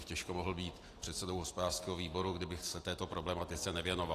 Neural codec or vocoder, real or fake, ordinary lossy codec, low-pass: none; real; AAC, 48 kbps; 14.4 kHz